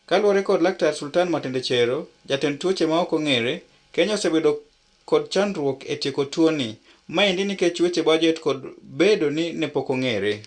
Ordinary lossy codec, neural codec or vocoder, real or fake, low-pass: Opus, 64 kbps; none; real; 9.9 kHz